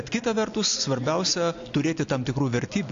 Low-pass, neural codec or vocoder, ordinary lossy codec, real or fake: 7.2 kHz; none; AAC, 48 kbps; real